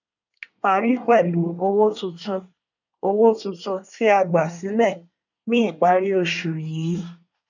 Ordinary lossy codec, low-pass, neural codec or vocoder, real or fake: none; 7.2 kHz; codec, 24 kHz, 1 kbps, SNAC; fake